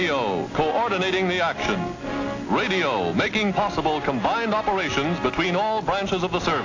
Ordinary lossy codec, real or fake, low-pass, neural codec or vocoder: AAC, 32 kbps; real; 7.2 kHz; none